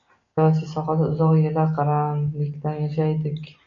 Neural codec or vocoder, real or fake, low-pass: none; real; 7.2 kHz